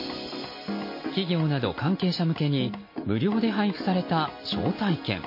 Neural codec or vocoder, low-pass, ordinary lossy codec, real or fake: none; 5.4 kHz; MP3, 24 kbps; real